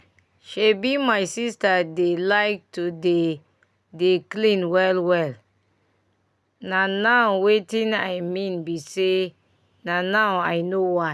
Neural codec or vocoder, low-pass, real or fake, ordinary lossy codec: none; none; real; none